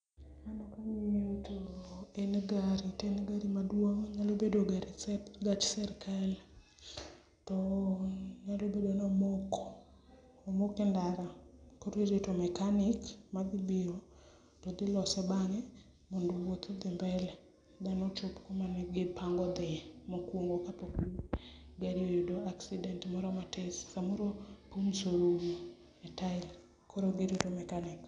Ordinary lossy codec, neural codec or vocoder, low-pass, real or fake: none; none; 9.9 kHz; real